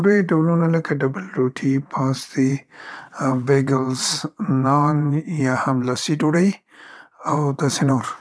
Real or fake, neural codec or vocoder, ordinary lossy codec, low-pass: fake; vocoder, 22.05 kHz, 80 mel bands, WaveNeXt; none; none